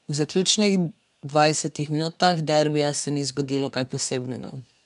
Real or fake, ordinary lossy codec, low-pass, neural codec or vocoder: fake; none; 10.8 kHz; codec, 24 kHz, 1 kbps, SNAC